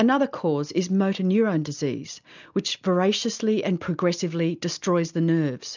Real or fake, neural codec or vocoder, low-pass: real; none; 7.2 kHz